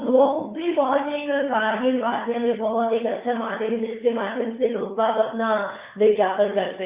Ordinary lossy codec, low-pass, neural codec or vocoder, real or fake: Opus, 64 kbps; 3.6 kHz; codec, 16 kHz, 4 kbps, FunCodec, trained on LibriTTS, 50 frames a second; fake